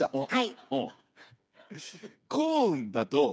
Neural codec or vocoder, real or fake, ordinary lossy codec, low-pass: codec, 16 kHz, 4 kbps, FreqCodec, smaller model; fake; none; none